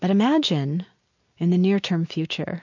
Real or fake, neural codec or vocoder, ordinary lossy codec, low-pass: real; none; MP3, 48 kbps; 7.2 kHz